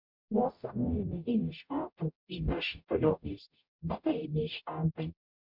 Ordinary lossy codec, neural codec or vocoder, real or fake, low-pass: MP3, 48 kbps; codec, 44.1 kHz, 0.9 kbps, DAC; fake; 5.4 kHz